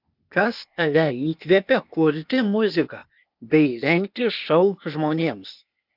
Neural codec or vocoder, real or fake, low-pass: codec, 16 kHz, 0.8 kbps, ZipCodec; fake; 5.4 kHz